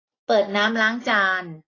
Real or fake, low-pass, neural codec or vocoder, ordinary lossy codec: real; 7.2 kHz; none; AAC, 32 kbps